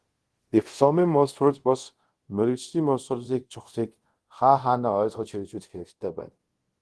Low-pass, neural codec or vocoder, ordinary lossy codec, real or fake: 10.8 kHz; codec, 24 kHz, 0.5 kbps, DualCodec; Opus, 16 kbps; fake